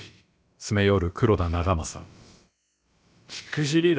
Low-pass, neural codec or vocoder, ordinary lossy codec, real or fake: none; codec, 16 kHz, about 1 kbps, DyCAST, with the encoder's durations; none; fake